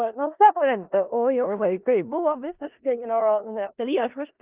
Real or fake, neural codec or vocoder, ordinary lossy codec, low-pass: fake; codec, 16 kHz in and 24 kHz out, 0.4 kbps, LongCat-Audio-Codec, four codebook decoder; Opus, 24 kbps; 3.6 kHz